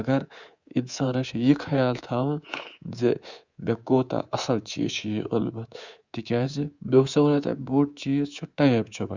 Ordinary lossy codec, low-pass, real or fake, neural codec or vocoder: Opus, 64 kbps; 7.2 kHz; fake; codec, 24 kHz, 3.1 kbps, DualCodec